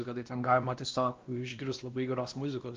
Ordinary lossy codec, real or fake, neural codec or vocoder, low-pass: Opus, 24 kbps; fake; codec, 16 kHz, 1 kbps, X-Codec, WavLM features, trained on Multilingual LibriSpeech; 7.2 kHz